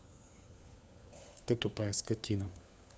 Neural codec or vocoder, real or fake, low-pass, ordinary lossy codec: codec, 16 kHz, 4 kbps, FunCodec, trained on LibriTTS, 50 frames a second; fake; none; none